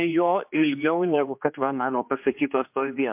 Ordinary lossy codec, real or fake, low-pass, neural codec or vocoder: MP3, 32 kbps; fake; 3.6 kHz; codec, 16 kHz, 2 kbps, X-Codec, HuBERT features, trained on general audio